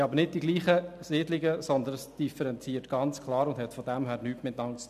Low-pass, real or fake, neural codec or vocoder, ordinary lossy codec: 14.4 kHz; real; none; none